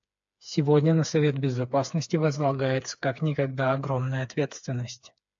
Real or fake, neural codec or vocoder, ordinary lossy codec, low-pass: fake; codec, 16 kHz, 4 kbps, FreqCodec, smaller model; AAC, 64 kbps; 7.2 kHz